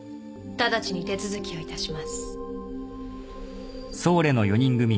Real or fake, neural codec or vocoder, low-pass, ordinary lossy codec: real; none; none; none